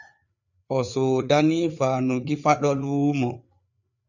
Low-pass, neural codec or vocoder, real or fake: 7.2 kHz; codec, 16 kHz, 4 kbps, FreqCodec, larger model; fake